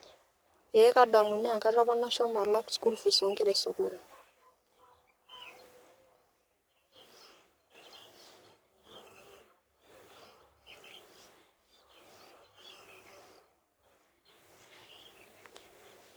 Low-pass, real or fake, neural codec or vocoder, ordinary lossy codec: none; fake; codec, 44.1 kHz, 3.4 kbps, Pupu-Codec; none